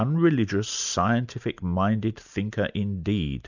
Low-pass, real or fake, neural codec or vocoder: 7.2 kHz; real; none